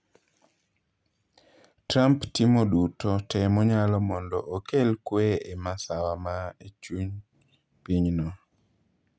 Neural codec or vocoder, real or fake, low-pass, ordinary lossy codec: none; real; none; none